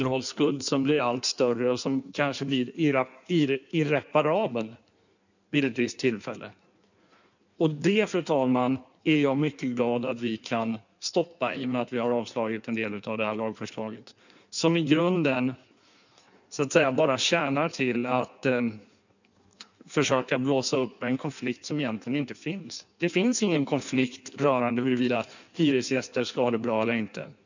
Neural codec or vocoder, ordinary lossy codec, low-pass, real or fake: codec, 16 kHz in and 24 kHz out, 1.1 kbps, FireRedTTS-2 codec; none; 7.2 kHz; fake